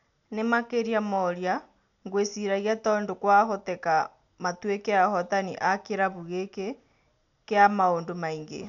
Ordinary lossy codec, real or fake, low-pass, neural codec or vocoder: none; real; 7.2 kHz; none